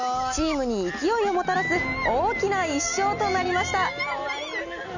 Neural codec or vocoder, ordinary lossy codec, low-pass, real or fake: none; none; 7.2 kHz; real